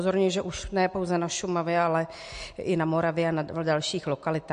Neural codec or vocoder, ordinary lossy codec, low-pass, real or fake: none; MP3, 48 kbps; 9.9 kHz; real